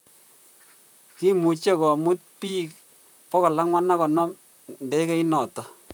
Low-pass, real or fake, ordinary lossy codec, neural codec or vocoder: none; fake; none; vocoder, 44.1 kHz, 128 mel bands, Pupu-Vocoder